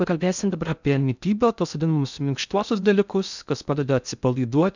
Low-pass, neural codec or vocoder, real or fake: 7.2 kHz; codec, 16 kHz in and 24 kHz out, 0.6 kbps, FocalCodec, streaming, 2048 codes; fake